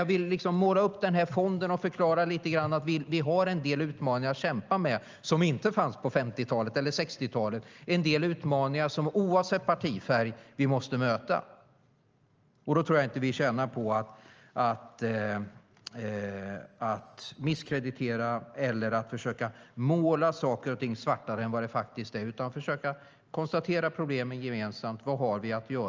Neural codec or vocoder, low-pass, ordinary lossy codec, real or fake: none; 7.2 kHz; Opus, 24 kbps; real